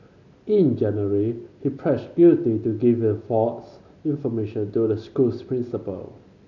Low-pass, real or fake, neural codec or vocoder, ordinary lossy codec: 7.2 kHz; real; none; none